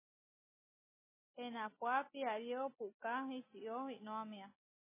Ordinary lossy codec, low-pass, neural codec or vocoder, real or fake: MP3, 16 kbps; 3.6 kHz; none; real